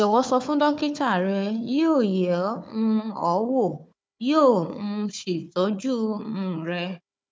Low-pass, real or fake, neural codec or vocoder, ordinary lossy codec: none; fake; codec, 16 kHz, 4 kbps, FunCodec, trained on Chinese and English, 50 frames a second; none